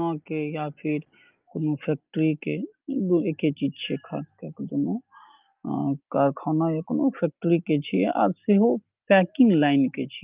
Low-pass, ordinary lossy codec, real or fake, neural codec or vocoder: 3.6 kHz; Opus, 32 kbps; real; none